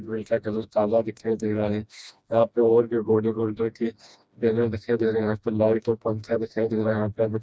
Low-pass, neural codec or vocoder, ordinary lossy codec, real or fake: none; codec, 16 kHz, 1 kbps, FreqCodec, smaller model; none; fake